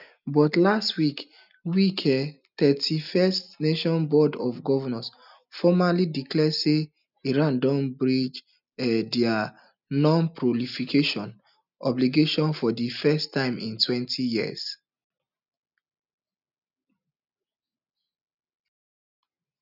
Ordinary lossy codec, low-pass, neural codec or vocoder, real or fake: none; 5.4 kHz; none; real